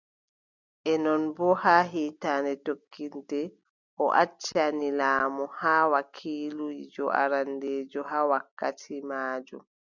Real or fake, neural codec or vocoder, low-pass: real; none; 7.2 kHz